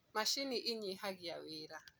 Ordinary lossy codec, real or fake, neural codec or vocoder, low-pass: none; real; none; none